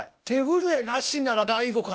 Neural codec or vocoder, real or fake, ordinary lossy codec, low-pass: codec, 16 kHz, 0.8 kbps, ZipCodec; fake; none; none